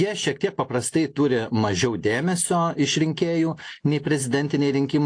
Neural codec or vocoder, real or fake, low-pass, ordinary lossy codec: none; real; 9.9 kHz; AAC, 48 kbps